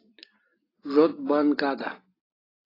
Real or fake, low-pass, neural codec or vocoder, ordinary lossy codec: real; 5.4 kHz; none; AAC, 24 kbps